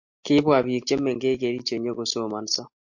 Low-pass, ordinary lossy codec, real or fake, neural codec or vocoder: 7.2 kHz; MP3, 48 kbps; real; none